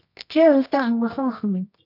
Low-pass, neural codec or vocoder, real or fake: 5.4 kHz; codec, 24 kHz, 0.9 kbps, WavTokenizer, medium music audio release; fake